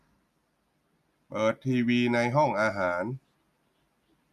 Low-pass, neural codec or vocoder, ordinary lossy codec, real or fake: 14.4 kHz; none; none; real